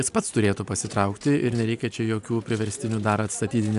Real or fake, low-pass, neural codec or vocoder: real; 10.8 kHz; none